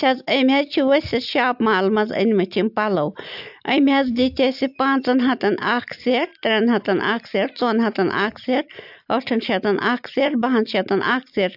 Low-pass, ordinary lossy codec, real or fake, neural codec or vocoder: 5.4 kHz; AAC, 48 kbps; real; none